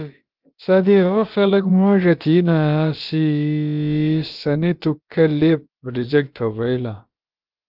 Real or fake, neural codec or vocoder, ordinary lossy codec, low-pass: fake; codec, 16 kHz, about 1 kbps, DyCAST, with the encoder's durations; Opus, 24 kbps; 5.4 kHz